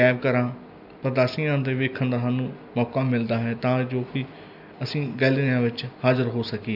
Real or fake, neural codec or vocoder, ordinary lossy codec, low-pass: real; none; none; 5.4 kHz